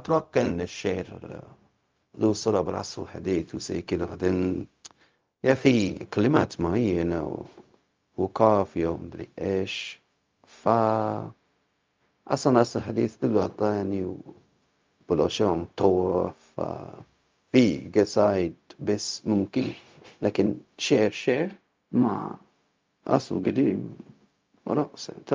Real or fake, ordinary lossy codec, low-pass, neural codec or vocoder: fake; Opus, 24 kbps; 7.2 kHz; codec, 16 kHz, 0.4 kbps, LongCat-Audio-Codec